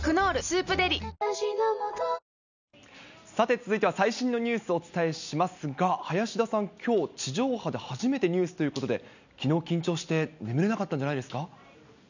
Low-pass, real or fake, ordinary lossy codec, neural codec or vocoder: 7.2 kHz; real; none; none